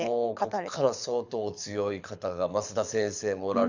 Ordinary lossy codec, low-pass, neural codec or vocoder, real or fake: none; 7.2 kHz; codec, 24 kHz, 6 kbps, HILCodec; fake